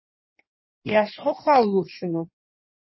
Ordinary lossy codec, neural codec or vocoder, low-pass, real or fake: MP3, 24 kbps; codec, 16 kHz in and 24 kHz out, 1.1 kbps, FireRedTTS-2 codec; 7.2 kHz; fake